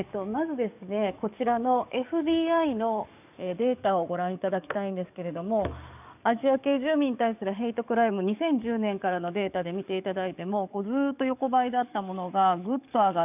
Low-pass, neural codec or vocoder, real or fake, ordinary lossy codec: 3.6 kHz; codec, 44.1 kHz, 7.8 kbps, DAC; fake; none